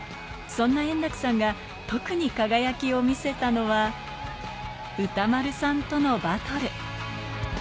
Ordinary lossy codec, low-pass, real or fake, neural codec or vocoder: none; none; real; none